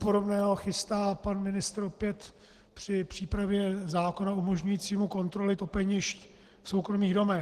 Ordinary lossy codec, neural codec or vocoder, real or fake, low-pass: Opus, 16 kbps; none; real; 14.4 kHz